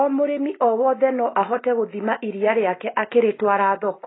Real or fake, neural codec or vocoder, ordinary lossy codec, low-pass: real; none; AAC, 16 kbps; 7.2 kHz